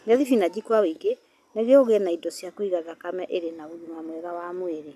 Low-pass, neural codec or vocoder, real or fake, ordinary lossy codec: 14.4 kHz; none; real; none